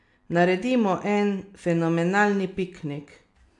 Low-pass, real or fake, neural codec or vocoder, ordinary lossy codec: 10.8 kHz; real; none; AAC, 64 kbps